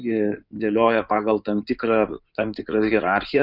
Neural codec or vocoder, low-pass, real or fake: codec, 16 kHz in and 24 kHz out, 2.2 kbps, FireRedTTS-2 codec; 5.4 kHz; fake